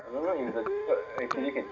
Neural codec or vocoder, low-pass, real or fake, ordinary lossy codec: none; 7.2 kHz; real; none